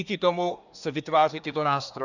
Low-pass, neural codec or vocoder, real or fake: 7.2 kHz; codec, 24 kHz, 1 kbps, SNAC; fake